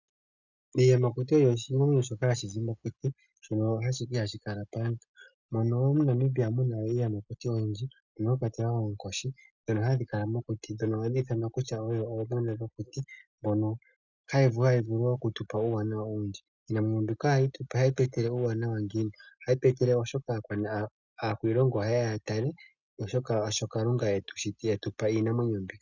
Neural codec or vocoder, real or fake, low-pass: none; real; 7.2 kHz